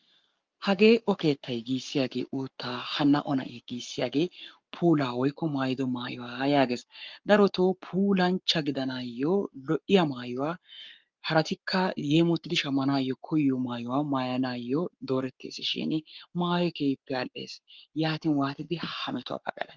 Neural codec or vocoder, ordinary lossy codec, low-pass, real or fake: codec, 44.1 kHz, 7.8 kbps, Pupu-Codec; Opus, 32 kbps; 7.2 kHz; fake